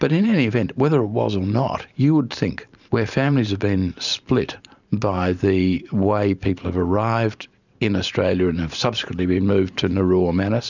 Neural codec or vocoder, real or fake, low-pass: vocoder, 44.1 kHz, 128 mel bands every 512 samples, BigVGAN v2; fake; 7.2 kHz